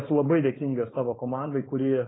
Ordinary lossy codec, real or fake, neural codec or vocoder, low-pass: AAC, 16 kbps; fake; codec, 16 kHz, 4.8 kbps, FACodec; 7.2 kHz